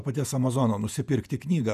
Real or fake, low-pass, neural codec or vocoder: fake; 14.4 kHz; vocoder, 48 kHz, 128 mel bands, Vocos